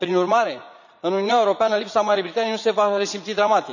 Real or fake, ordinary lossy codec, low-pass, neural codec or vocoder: fake; none; 7.2 kHz; vocoder, 44.1 kHz, 80 mel bands, Vocos